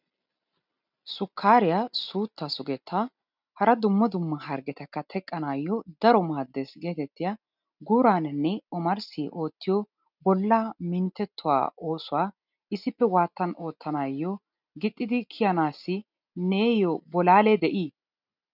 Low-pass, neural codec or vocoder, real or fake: 5.4 kHz; none; real